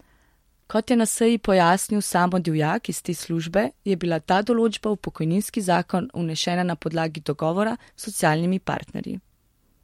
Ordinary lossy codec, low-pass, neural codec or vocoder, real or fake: MP3, 64 kbps; 19.8 kHz; none; real